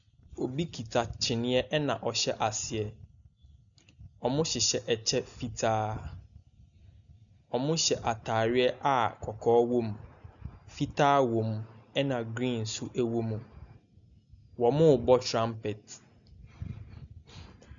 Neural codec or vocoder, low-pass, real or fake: none; 7.2 kHz; real